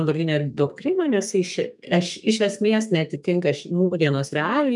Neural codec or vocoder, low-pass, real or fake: codec, 32 kHz, 1.9 kbps, SNAC; 10.8 kHz; fake